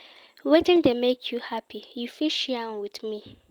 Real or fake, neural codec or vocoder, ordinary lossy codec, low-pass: real; none; Opus, 32 kbps; 19.8 kHz